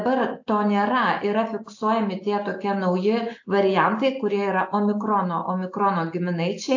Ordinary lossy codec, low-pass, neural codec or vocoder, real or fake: AAC, 48 kbps; 7.2 kHz; none; real